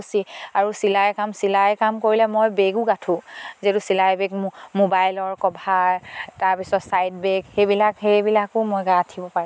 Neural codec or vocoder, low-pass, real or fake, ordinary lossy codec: none; none; real; none